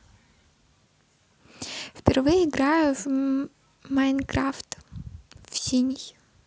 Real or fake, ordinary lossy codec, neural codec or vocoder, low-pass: real; none; none; none